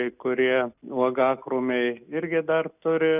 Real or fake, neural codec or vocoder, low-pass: real; none; 3.6 kHz